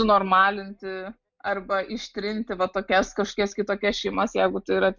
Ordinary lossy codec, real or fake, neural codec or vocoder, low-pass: MP3, 64 kbps; real; none; 7.2 kHz